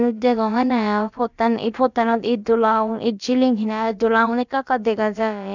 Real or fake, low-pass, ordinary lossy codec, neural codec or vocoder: fake; 7.2 kHz; none; codec, 16 kHz, about 1 kbps, DyCAST, with the encoder's durations